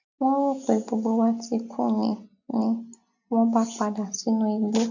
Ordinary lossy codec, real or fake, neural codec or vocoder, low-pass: none; real; none; 7.2 kHz